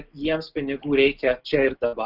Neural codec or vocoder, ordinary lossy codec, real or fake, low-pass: none; Opus, 32 kbps; real; 5.4 kHz